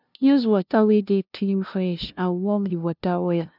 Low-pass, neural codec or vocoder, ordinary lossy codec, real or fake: 5.4 kHz; codec, 16 kHz, 0.5 kbps, FunCodec, trained on LibriTTS, 25 frames a second; none; fake